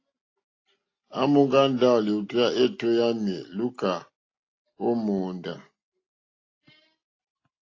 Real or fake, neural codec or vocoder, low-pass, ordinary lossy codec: real; none; 7.2 kHz; AAC, 32 kbps